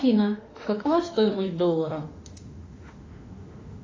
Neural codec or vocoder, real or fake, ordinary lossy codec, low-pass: autoencoder, 48 kHz, 32 numbers a frame, DAC-VAE, trained on Japanese speech; fake; AAC, 32 kbps; 7.2 kHz